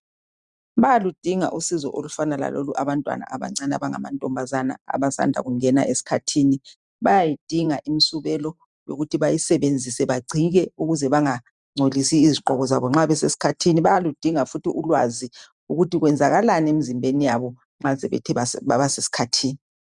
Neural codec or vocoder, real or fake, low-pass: vocoder, 44.1 kHz, 128 mel bands every 512 samples, BigVGAN v2; fake; 10.8 kHz